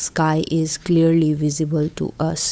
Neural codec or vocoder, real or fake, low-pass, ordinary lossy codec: codec, 16 kHz, 8 kbps, FunCodec, trained on Chinese and English, 25 frames a second; fake; none; none